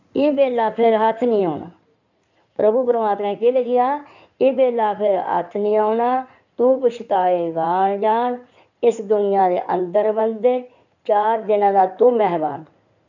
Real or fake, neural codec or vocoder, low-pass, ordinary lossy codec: fake; codec, 16 kHz in and 24 kHz out, 2.2 kbps, FireRedTTS-2 codec; 7.2 kHz; none